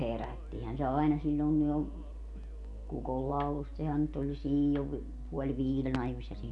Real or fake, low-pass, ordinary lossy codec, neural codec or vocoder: real; 10.8 kHz; none; none